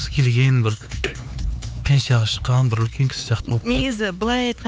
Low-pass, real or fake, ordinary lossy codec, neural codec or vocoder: none; fake; none; codec, 16 kHz, 4 kbps, X-Codec, HuBERT features, trained on LibriSpeech